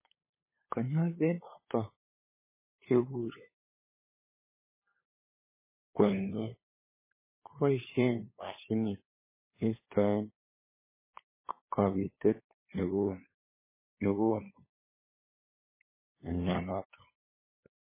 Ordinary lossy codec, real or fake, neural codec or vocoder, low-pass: MP3, 16 kbps; fake; codec, 16 kHz, 8 kbps, FunCodec, trained on LibriTTS, 25 frames a second; 3.6 kHz